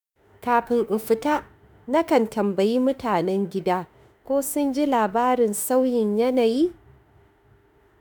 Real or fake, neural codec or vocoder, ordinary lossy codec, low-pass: fake; autoencoder, 48 kHz, 32 numbers a frame, DAC-VAE, trained on Japanese speech; none; none